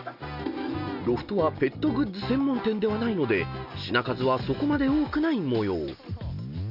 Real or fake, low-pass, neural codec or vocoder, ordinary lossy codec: real; 5.4 kHz; none; none